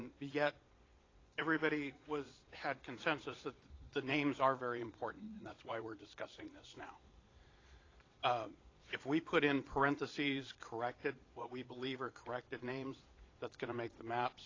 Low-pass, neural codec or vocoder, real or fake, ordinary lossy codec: 7.2 kHz; vocoder, 22.05 kHz, 80 mel bands, Vocos; fake; AAC, 32 kbps